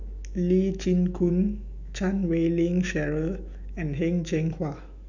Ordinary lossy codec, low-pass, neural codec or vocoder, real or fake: none; 7.2 kHz; autoencoder, 48 kHz, 128 numbers a frame, DAC-VAE, trained on Japanese speech; fake